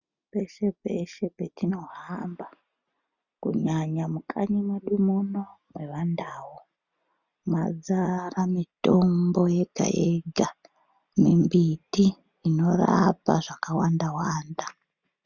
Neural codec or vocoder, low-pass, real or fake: none; 7.2 kHz; real